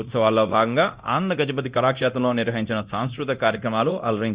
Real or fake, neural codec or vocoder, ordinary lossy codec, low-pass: fake; codec, 24 kHz, 0.9 kbps, DualCodec; Opus, 64 kbps; 3.6 kHz